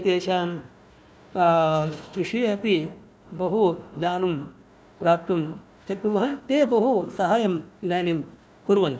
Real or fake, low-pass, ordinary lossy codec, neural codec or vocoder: fake; none; none; codec, 16 kHz, 1 kbps, FunCodec, trained on Chinese and English, 50 frames a second